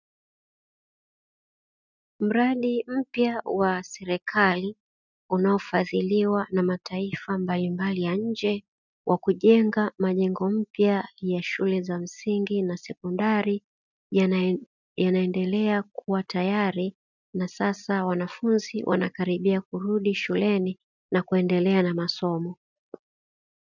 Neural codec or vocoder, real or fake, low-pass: none; real; 7.2 kHz